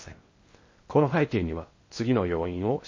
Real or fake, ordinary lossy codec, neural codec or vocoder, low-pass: fake; MP3, 32 kbps; codec, 16 kHz in and 24 kHz out, 0.6 kbps, FocalCodec, streaming, 2048 codes; 7.2 kHz